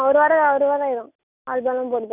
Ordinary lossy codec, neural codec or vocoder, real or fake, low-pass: none; none; real; 3.6 kHz